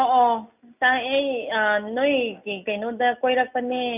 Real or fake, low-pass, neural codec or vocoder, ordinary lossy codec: real; 3.6 kHz; none; none